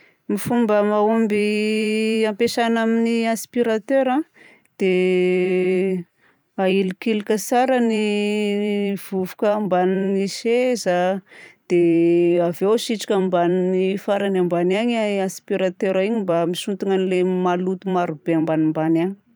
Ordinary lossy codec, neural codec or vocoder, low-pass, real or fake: none; vocoder, 44.1 kHz, 128 mel bands every 512 samples, BigVGAN v2; none; fake